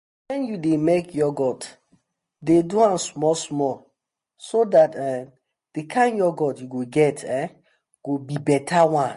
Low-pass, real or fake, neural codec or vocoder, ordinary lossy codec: 10.8 kHz; real; none; MP3, 48 kbps